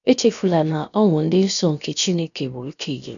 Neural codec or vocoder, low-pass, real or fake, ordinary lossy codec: codec, 16 kHz, about 1 kbps, DyCAST, with the encoder's durations; 7.2 kHz; fake; none